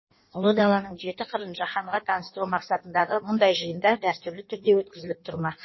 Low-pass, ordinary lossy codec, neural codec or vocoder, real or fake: 7.2 kHz; MP3, 24 kbps; codec, 16 kHz in and 24 kHz out, 1.1 kbps, FireRedTTS-2 codec; fake